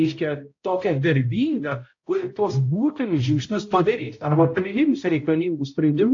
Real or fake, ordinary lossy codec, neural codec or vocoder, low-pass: fake; AAC, 48 kbps; codec, 16 kHz, 0.5 kbps, X-Codec, HuBERT features, trained on balanced general audio; 7.2 kHz